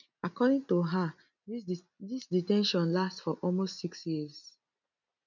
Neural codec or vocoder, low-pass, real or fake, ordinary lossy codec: none; 7.2 kHz; real; none